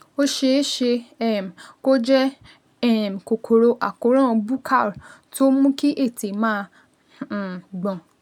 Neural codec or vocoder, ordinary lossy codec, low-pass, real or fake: none; none; 19.8 kHz; real